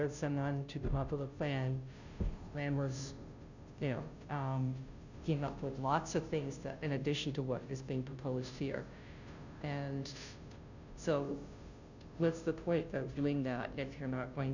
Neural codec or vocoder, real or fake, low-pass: codec, 16 kHz, 0.5 kbps, FunCodec, trained on Chinese and English, 25 frames a second; fake; 7.2 kHz